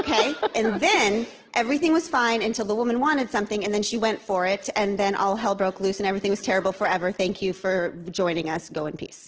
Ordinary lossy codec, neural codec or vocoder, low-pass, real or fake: Opus, 16 kbps; none; 7.2 kHz; real